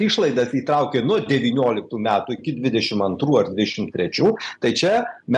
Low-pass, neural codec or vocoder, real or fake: 10.8 kHz; none; real